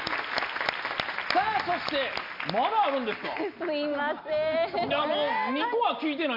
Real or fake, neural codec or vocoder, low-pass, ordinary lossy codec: real; none; 5.4 kHz; MP3, 48 kbps